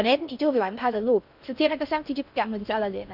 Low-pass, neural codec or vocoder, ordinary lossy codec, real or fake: 5.4 kHz; codec, 16 kHz in and 24 kHz out, 0.6 kbps, FocalCodec, streaming, 4096 codes; none; fake